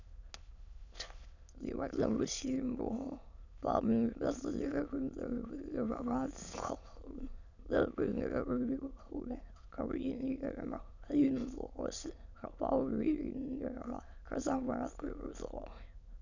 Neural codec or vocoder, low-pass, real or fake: autoencoder, 22.05 kHz, a latent of 192 numbers a frame, VITS, trained on many speakers; 7.2 kHz; fake